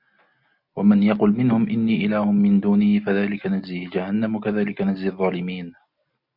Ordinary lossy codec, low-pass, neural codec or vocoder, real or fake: MP3, 48 kbps; 5.4 kHz; none; real